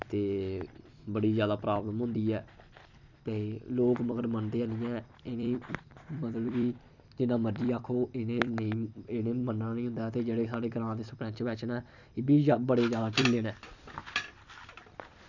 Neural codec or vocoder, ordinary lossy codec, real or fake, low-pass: vocoder, 44.1 kHz, 128 mel bands every 256 samples, BigVGAN v2; none; fake; 7.2 kHz